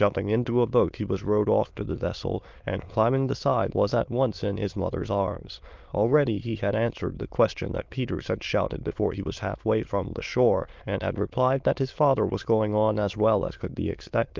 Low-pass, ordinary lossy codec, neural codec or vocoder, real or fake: 7.2 kHz; Opus, 32 kbps; autoencoder, 22.05 kHz, a latent of 192 numbers a frame, VITS, trained on many speakers; fake